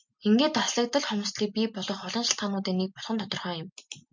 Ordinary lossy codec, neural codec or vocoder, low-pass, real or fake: MP3, 48 kbps; none; 7.2 kHz; real